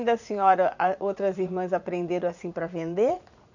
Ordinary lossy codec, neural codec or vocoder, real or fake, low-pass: none; vocoder, 44.1 kHz, 80 mel bands, Vocos; fake; 7.2 kHz